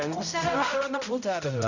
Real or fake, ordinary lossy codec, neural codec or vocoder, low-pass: fake; none; codec, 16 kHz, 0.5 kbps, X-Codec, HuBERT features, trained on balanced general audio; 7.2 kHz